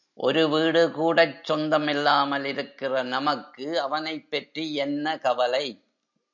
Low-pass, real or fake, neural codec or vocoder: 7.2 kHz; real; none